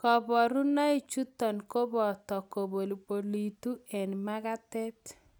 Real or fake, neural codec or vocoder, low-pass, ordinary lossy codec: real; none; none; none